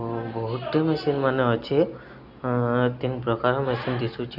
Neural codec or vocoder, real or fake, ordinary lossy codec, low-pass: none; real; none; 5.4 kHz